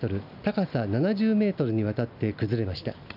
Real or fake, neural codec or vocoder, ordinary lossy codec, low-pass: real; none; none; 5.4 kHz